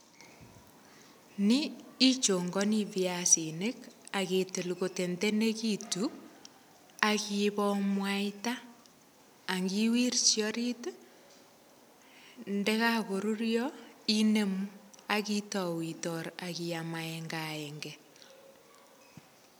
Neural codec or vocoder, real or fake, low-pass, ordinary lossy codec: none; real; none; none